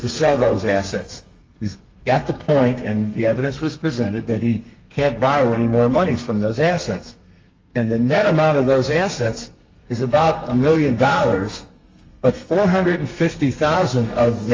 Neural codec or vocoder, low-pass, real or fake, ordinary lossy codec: codec, 32 kHz, 1.9 kbps, SNAC; 7.2 kHz; fake; Opus, 32 kbps